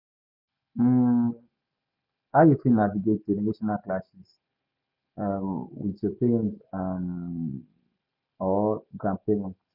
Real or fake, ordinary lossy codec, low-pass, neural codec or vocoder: real; none; 5.4 kHz; none